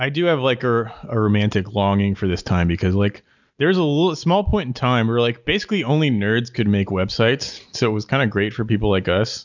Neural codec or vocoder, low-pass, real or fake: none; 7.2 kHz; real